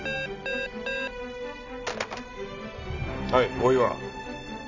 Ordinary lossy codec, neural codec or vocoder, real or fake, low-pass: none; none; real; 7.2 kHz